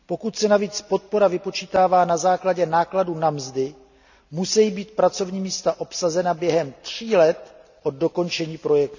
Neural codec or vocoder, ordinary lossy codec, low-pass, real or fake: none; none; 7.2 kHz; real